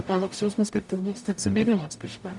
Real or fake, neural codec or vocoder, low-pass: fake; codec, 44.1 kHz, 0.9 kbps, DAC; 10.8 kHz